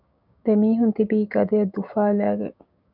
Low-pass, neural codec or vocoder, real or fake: 5.4 kHz; codec, 16 kHz, 6 kbps, DAC; fake